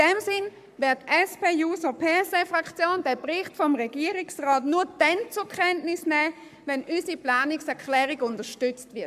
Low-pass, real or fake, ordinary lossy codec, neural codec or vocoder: 14.4 kHz; fake; AAC, 96 kbps; codec, 44.1 kHz, 7.8 kbps, Pupu-Codec